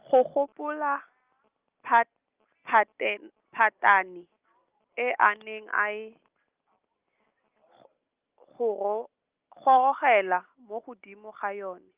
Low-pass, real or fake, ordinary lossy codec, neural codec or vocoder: 3.6 kHz; real; Opus, 32 kbps; none